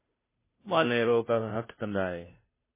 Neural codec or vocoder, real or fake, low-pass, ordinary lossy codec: codec, 16 kHz, 0.5 kbps, FunCodec, trained on Chinese and English, 25 frames a second; fake; 3.6 kHz; MP3, 16 kbps